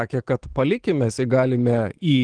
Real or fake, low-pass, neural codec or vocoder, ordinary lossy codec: fake; 9.9 kHz; autoencoder, 48 kHz, 128 numbers a frame, DAC-VAE, trained on Japanese speech; Opus, 16 kbps